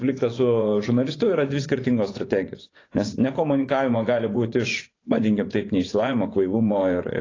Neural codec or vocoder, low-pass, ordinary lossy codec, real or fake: vocoder, 24 kHz, 100 mel bands, Vocos; 7.2 kHz; AAC, 32 kbps; fake